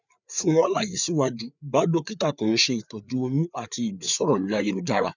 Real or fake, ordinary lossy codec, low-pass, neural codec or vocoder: fake; none; 7.2 kHz; codec, 16 kHz, 4 kbps, FreqCodec, larger model